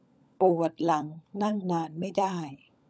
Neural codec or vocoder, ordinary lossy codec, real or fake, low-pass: codec, 16 kHz, 16 kbps, FunCodec, trained on LibriTTS, 50 frames a second; none; fake; none